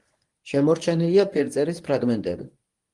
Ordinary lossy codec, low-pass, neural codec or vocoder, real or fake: Opus, 24 kbps; 10.8 kHz; codec, 24 kHz, 0.9 kbps, WavTokenizer, medium speech release version 1; fake